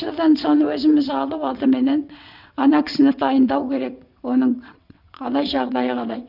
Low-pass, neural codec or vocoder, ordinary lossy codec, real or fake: 5.4 kHz; vocoder, 22.05 kHz, 80 mel bands, WaveNeXt; none; fake